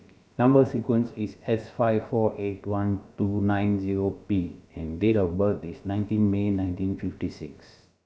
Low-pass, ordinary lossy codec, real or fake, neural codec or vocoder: none; none; fake; codec, 16 kHz, about 1 kbps, DyCAST, with the encoder's durations